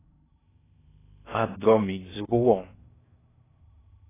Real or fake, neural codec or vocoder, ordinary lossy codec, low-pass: fake; codec, 16 kHz in and 24 kHz out, 0.6 kbps, FocalCodec, streaming, 4096 codes; AAC, 16 kbps; 3.6 kHz